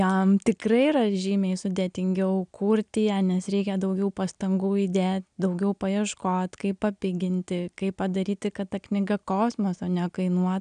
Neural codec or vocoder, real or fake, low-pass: none; real; 9.9 kHz